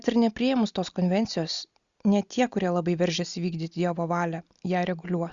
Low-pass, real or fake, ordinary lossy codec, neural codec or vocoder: 7.2 kHz; real; Opus, 64 kbps; none